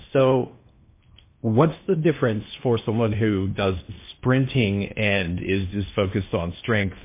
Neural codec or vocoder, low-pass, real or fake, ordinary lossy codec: codec, 16 kHz in and 24 kHz out, 0.8 kbps, FocalCodec, streaming, 65536 codes; 3.6 kHz; fake; MP3, 24 kbps